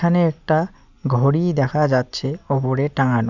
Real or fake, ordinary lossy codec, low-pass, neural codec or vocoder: real; none; 7.2 kHz; none